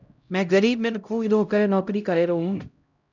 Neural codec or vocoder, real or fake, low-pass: codec, 16 kHz, 0.5 kbps, X-Codec, HuBERT features, trained on LibriSpeech; fake; 7.2 kHz